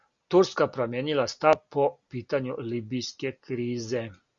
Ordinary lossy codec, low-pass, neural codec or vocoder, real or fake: Opus, 64 kbps; 7.2 kHz; none; real